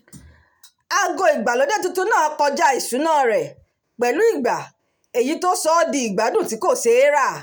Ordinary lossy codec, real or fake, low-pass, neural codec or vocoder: none; real; none; none